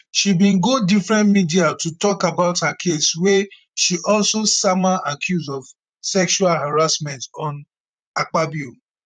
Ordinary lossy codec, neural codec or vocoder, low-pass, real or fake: none; vocoder, 44.1 kHz, 128 mel bands, Pupu-Vocoder; 9.9 kHz; fake